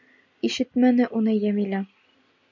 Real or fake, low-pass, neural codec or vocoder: real; 7.2 kHz; none